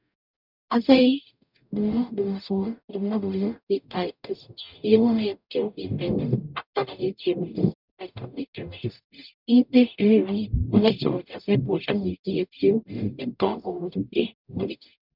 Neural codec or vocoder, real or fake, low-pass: codec, 44.1 kHz, 0.9 kbps, DAC; fake; 5.4 kHz